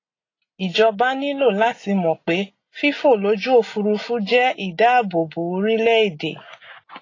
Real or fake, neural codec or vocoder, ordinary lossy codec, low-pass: real; none; AAC, 32 kbps; 7.2 kHz